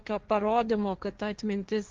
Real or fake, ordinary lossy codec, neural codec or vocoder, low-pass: fake; Opus, 32 kbps; codec, 16 kHz, 1.1 kbps, Voila-Tokenizer; 7.2 kHz